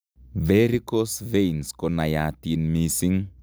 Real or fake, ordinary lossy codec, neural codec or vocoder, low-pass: real; none; none; none